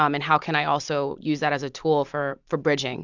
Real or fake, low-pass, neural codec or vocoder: real; 7.2 kHz; none